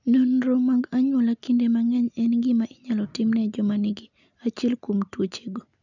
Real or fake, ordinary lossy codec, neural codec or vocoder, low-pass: real; none; none; 7.2 kHz